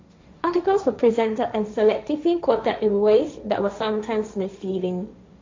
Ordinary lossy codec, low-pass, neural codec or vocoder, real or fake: MP3, 48 kbps; 7.2 kHz; codec, 16 kHz, 1.1 kbps, Voila-Tokenizer; fake